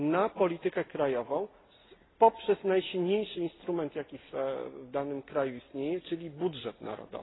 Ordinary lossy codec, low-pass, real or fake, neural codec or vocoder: AAC, 16 kbps; 7.2 kHz; real; none